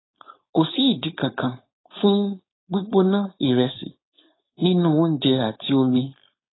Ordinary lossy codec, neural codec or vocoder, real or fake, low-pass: AAC, 16 kbps; codec, 16 kHz, 4.8 kbps, FACodec; fake; 7.2 kHz